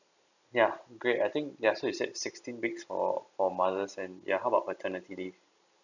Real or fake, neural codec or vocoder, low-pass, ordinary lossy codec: real; none; 7.2 kHz; none